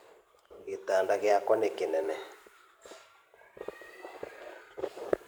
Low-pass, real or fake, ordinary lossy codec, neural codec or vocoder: none; fake; none; vocoder, 44.1 kHz, 128 mel bands every 512 samples, BigVGAN v2